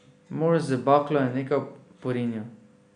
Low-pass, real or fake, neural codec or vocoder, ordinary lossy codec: 9.9 kHz; real; none; none